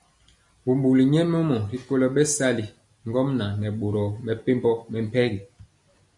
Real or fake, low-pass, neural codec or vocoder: real; 10.8 kHz; none